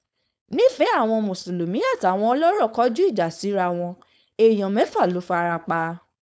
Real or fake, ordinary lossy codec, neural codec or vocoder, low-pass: fake; none; codec, 16 kHz, 4.8 kbps, FACodec; none